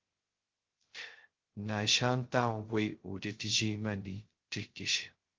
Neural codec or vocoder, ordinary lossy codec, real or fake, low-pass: codec, 16 kHz, 0.2 kbps, FocalCodec; Opus, 16 kbps; fake; 7.2 kHz